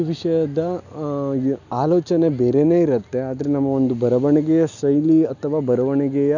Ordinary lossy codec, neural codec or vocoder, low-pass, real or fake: none; none; 7.2 kHz; real